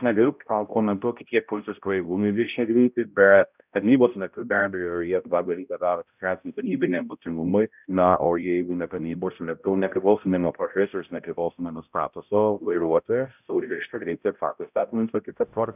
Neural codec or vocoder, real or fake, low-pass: codec, 16 kHz, 0.5 kbps, X-Codec, HuBERT features, trained on balanced general audio; fake; 3.6 kHz